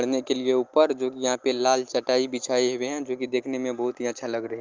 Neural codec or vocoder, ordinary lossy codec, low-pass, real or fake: none; Opus, 32 kbps; 7.2 kHz; real